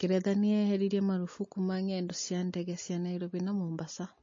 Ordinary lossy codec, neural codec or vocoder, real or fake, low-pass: MP3, 32 kbps; none; real; 7.2 kHz